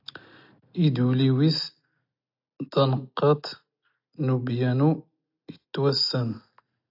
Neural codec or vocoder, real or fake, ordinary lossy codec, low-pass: none; real; AAC, 48 kbps; 5.4 kHz